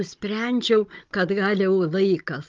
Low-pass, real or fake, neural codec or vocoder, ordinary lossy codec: 7.2 kHz; fake; codec, 16 kHz, 16 kbps, FunCodec, trained on Chinese and English, 50 frames a second; Opus, 32 kbps